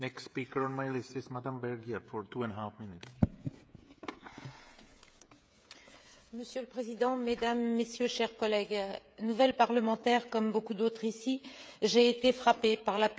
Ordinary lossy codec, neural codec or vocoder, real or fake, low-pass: none; codec, 16 kHz, 16 kbps, FreqCodec, smaller model; fake; none